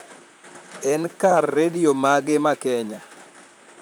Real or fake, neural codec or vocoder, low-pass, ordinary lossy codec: fake; vocoder, 44.1 kHz, 128 mel bands, Pupu-Vocoder; none; none